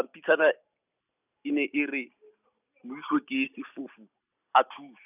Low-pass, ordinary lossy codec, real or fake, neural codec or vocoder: 3.6 kHz; none; real; none